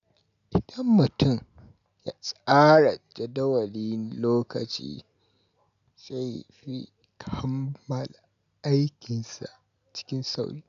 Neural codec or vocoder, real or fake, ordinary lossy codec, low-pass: none; real; none; 7.2 kHz